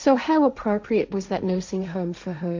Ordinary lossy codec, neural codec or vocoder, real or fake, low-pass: MP3, 64 kbps; codec, 16 kHz, 1.1 kbps, Voila-Tokenizer; fake; 7.2 kHz